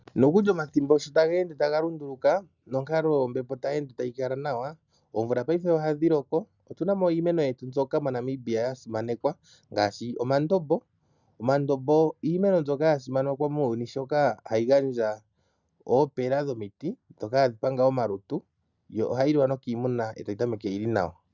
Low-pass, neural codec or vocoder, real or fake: 7.2 kHz; none; real